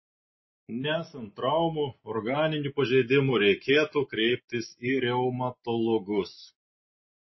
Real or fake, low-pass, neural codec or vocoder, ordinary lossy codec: real; 7.2 kHz; none; MP3, 24 kbps